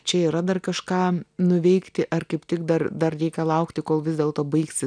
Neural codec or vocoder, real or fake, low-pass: none; real; 9.9 kHz